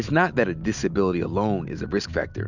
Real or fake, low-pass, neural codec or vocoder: real; 7.2 kHz; none